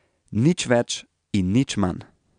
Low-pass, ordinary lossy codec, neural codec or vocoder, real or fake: 9.9 kHz; none; none; real